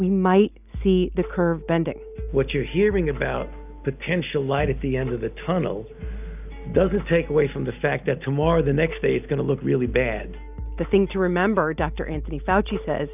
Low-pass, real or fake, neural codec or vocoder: 3.6 kHz; real; none